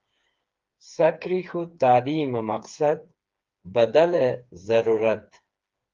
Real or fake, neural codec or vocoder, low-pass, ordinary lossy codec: fake; codec, 16 kHz, 4 kbps, FreqCodec, smaller model; 7.2 kHz; Opus, 32 kbps